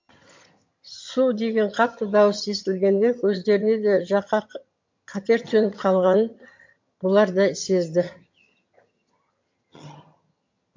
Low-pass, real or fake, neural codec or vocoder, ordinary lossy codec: 7.2 kHz; fake; vocoder, 22.05 kHz, 80 mel bands, HiFi-GAN; MP3, 48 kbps